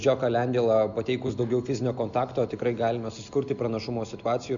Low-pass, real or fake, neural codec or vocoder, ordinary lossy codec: 7.2 kHz; real; none; MP3, 96 kbps